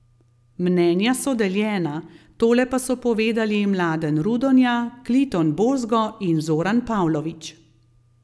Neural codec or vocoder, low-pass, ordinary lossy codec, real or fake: none; none; none; real